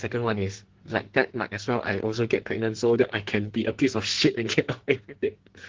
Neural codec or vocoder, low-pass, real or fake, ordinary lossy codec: codec, 44.1 kHz, 2.6 kbps, SNAC; 7.2 kHz; fake; Opus, 16 kbps